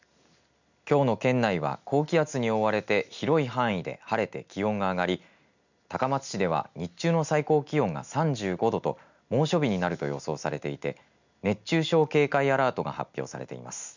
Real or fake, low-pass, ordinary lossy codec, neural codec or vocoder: real; 7.2 kHz; none; none